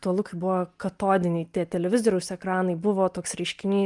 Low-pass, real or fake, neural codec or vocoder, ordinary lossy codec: 10.8 kHz; real; none; Opus, 32 kbps